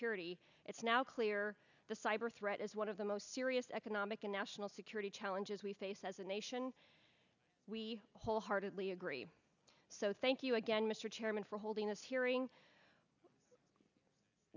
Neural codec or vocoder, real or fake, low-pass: none; real; 7.2 kHz